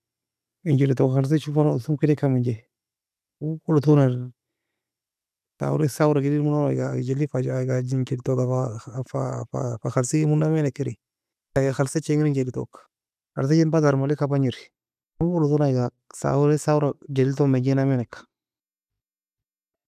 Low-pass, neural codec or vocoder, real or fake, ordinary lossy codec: 14.4 kHz; none; real; none